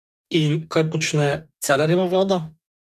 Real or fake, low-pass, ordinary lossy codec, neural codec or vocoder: fake; 14.4 kHz; none; codec, 44.1 kHz, 2.6 kbps, DAC